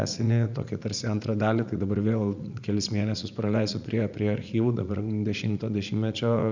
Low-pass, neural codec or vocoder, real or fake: 7.2 kHz; vocoder, 44.1 kHz, 80 mel bands, Vocos; fake